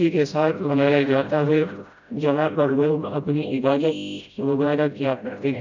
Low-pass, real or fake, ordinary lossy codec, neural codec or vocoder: 7.2 kHz; fake; none; codec, 16 kHz, 0.5 kbps, FreqCodec, smaller model